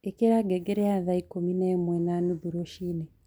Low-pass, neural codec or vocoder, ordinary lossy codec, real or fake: none; none; none; real